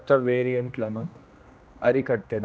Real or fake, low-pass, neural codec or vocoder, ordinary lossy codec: fake; none; codec, 16 kHz, 1 kbps, X-Codec, HuBERT features, trained on general audio; none